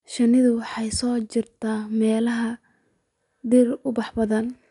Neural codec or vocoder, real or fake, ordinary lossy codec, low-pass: none; real; none; 10.8 kHz